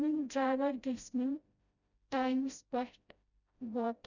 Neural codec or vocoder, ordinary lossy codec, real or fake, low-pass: codec, 16 kHz, 0.5 kbps, FreqCodec, smaller model; none; fake; 7.2 kHz